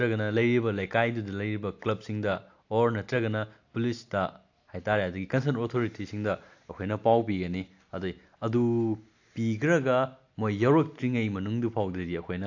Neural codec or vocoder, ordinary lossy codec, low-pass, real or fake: none; AAC, 48 kbps; 7.2 kHz; real